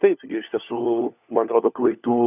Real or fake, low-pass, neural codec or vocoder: fake; 3.6 kHz; codec, 16 kHz, 4 kbps, FunCodec, trained on LibriTTS, 50 frames a second